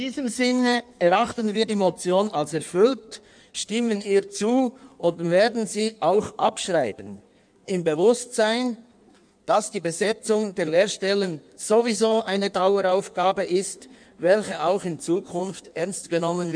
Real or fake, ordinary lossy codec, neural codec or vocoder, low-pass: fake; none; codec, 16 kHz in and 24 kHz out, 1.1 kbps, FireRedTTS-2 codec; 9.9 kHz